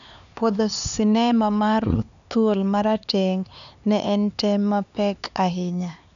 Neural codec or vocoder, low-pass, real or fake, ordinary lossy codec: codec, 16 kHz, 4 kbps, X-Codec, HuBERT features, trained on LibriSpeech; 7.2 kHz; fake; none